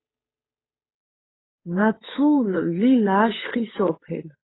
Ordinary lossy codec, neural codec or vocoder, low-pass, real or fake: AAC, 16 kbps; codec, 16 kHz, 8 kbps, FunCodec, trained on Chinese and English, 25 frames a second; 7.2 kHz; fake